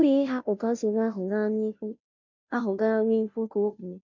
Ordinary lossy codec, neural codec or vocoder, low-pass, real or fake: none; codec, 16 kHz, 0.5 kbps, FunCodec, trained on Chinese and English, 25 frames a second; 7.2 kHz; fake